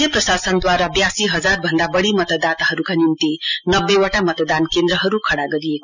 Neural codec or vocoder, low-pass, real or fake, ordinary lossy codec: none; 7.2 kHz; real; none